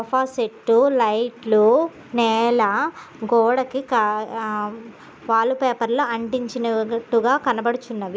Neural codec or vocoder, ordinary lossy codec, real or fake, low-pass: none; none; real; none